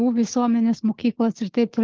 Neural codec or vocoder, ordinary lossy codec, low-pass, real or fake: codec, 16 kHz, 6 kbps, DAC; Opus, 16 kbps; 7.2 kHz; fake